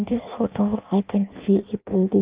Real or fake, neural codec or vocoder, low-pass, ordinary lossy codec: fake; codec, 16 kHz in and 24 kHz out, 0.6 kbps, FireRedTTS-2 codec; 3.6 kHz; Opus, 32 kbps